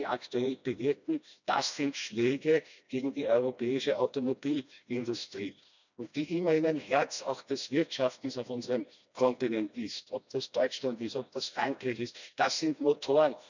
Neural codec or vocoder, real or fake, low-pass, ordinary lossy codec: codec, 16 kHz, 1 kbps, FreqCodec, smaller model; fake; 7.2 kHz; none